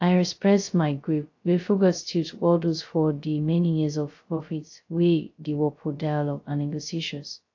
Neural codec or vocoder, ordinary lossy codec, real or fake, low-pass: codec, 16 kHz, 0.2 kbps, FocalCodec; AAC, 48 kbps; fake; 7.2 kHz